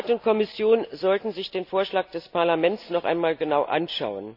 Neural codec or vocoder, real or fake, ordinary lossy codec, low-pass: none; real; none; 5.4 kHz